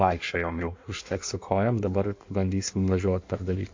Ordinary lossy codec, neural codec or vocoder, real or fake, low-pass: MP3, 48 kbps; codec, 16 kHz in and 24 kHz out, 1.1 kbps, FireRedTTS-2 codec; fake; 7.2 kHz